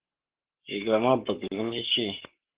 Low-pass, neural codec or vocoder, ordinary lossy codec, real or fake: 3.6 kHz; codec, 44.1 kHz, 7.8 kbps, Pupu-Codec; Opus, 16 kbps; fake